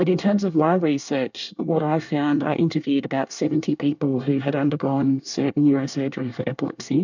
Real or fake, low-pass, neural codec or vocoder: fake; 7.2 kHz; codec, 24 kHz, 1 kbps, SNAC